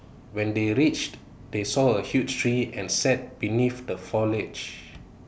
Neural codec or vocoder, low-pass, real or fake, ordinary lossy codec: none; none; real; none